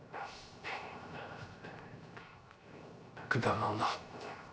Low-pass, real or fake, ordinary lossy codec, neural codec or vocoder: none; fake; none; codec, 16 kHz, 0.3 kbps, FocalCodec